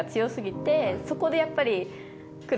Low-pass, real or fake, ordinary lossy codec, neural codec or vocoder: none; real; none; none